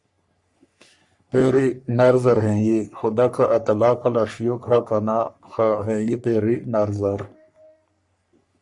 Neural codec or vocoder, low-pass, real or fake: codec, 44.1 kHz, 3.4 kbps, Pupu-Codec; 10.8 kHz; fake